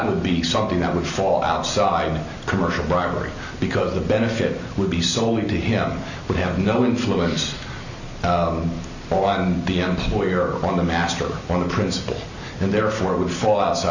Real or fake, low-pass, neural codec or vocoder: real; 7.2 kHz; none